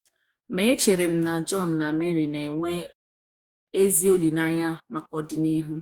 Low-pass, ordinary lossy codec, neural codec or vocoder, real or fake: 19.8 kHz; Opus, 64 kbps; codec, 44.1 kHz, 2.6 kbps, DAC; fake